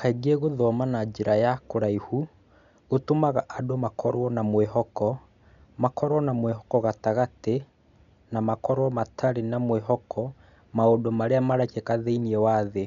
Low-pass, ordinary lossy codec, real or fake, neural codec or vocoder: 7.2 kHz; none; real; none